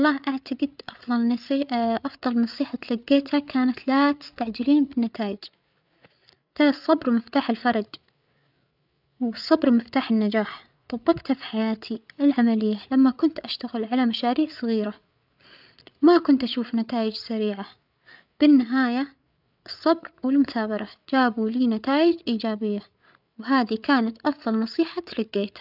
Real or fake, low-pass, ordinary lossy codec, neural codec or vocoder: fake; 5.4 kHz; none; codec, 16 kHz, 8 kbps, FreqCodec, larger model